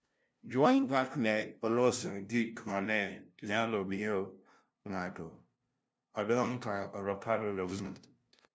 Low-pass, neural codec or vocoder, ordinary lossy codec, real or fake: none; codec, 16 kHz, 0.5 kbps, FunCodec, trained on LibriTTS, 25 frames a second; none; fake